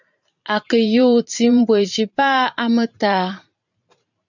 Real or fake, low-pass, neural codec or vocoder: fake; 7.2 kHz; vocoder, 44.1 kHz, 128 mel bands every 512 samples, BigVGAN v2